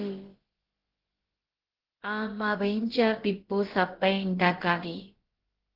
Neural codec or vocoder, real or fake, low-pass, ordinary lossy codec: codec, 16 kHz, about 1 kbps, DyCAST, with the encoder's durations; fake; 5.4 kHz; Opus, 16 kbps